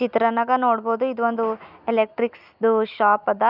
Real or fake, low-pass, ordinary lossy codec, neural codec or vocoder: real; 5.4 kHz; none; none